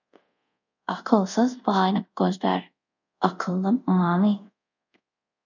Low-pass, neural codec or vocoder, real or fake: 7.2 kHz; codec, 24 kHz, 0.5 kbps, DualCodec; fake